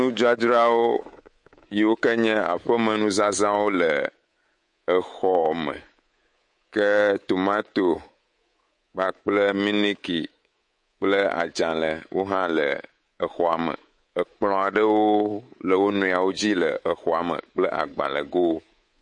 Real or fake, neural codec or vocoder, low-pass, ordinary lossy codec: fake; codec, 24 kHz, 3.1 kbps, DualCodec; 10.8 kHz; MP3, 48 kbps